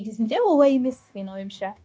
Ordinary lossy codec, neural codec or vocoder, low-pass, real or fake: none; codec, 16 kHz, 0.9 kbps, LongCat-Audio-Codec; none; fake